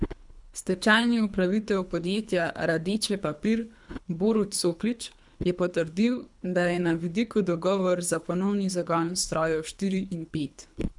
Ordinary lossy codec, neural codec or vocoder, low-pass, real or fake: none; codec, 24 kHz, 3 kbps, HILCodec; 10.8 kHz; fake